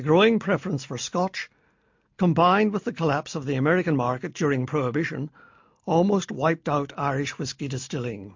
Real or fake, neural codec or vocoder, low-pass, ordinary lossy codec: real; none; 7.2 kHz; MP3, 48 kbps